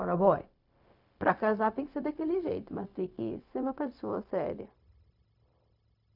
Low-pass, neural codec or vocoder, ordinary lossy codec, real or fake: 5.4 kHz; codec, 16 kHz, 0.4 kbps, LongCat-Audio-Codec; none; fake